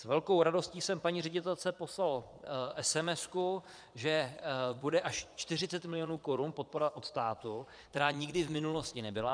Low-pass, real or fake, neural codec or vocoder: 9.9 kHz; fake; vocoder, 22.05 kHz, 80 mel bands, Vocos